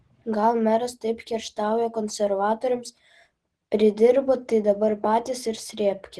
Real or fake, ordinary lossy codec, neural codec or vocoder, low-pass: real; Opus, 16 kbps; none; 10.8 kHz